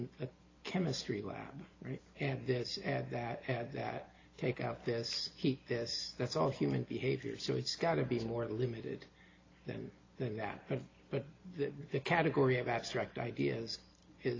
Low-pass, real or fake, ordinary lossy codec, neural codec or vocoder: 7.2 kHz; real; AAC, 32 kbps; none